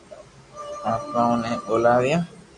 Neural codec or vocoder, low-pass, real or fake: none; 10.8 kHz; real